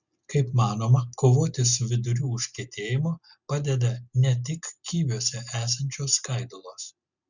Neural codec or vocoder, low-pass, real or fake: none; 7.2 kHz; real